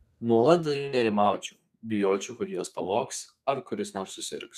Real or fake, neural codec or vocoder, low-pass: fake; codec, 32 kHz, 1.9 kbps, SNAC; 14.4 kHz